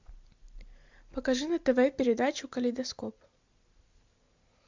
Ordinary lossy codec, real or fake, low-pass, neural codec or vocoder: MP3, 64 kbps; real; 7.2 kHz; none